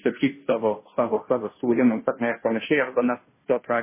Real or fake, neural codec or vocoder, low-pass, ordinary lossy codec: fake; codec, 16 kHz, 0.5 kbps, FunCodec, trained on Chinese and English, 25 frames a second; 3.6 kHz; MP3, 16 kbps